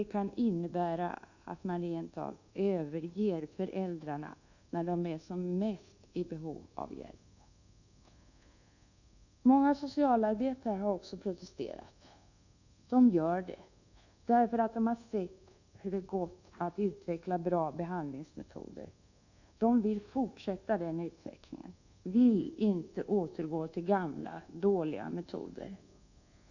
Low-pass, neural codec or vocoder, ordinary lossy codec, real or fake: 7.2 kHz; codec, 24 kHz, 1.2 kbps, DualCodec; none; fake